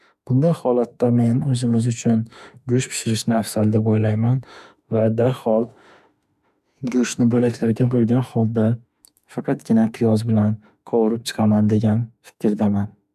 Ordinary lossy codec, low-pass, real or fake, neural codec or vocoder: none; 14.4 kHz; fake; autoencoder, 48 kHz, 32 numbers a frame, DAC-VAE, trained on Japanese speech